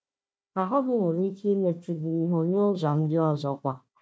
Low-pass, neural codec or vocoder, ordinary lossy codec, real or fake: none; codec, 16 kHz, 1 kbps, FunCodec, trained on Chinese and English, 50 frames a second; none; fake